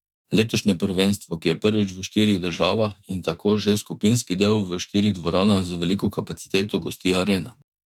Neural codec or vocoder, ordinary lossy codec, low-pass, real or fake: autoencoder, 48 kHz, 32 numbers a frame, DAC-VAE, trained on Japanese speech; none; 19.8 kHz; fake